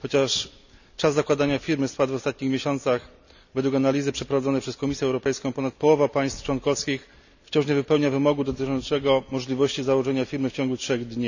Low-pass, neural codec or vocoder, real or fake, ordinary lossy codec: 7.2 kHz; none; real; none